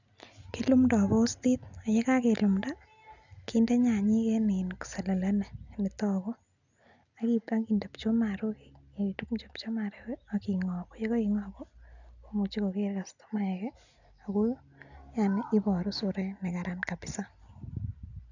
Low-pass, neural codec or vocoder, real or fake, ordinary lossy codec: 7.2 kHz; none; real; none